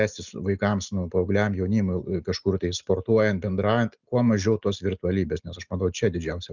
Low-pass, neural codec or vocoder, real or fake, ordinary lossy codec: 7.2 kHz; none; real; Opus, 64 kbps